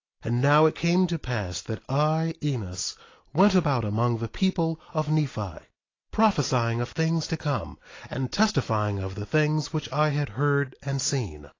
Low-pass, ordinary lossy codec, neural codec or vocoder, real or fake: 7.2 kHz; AAC, 32 kbps; none; real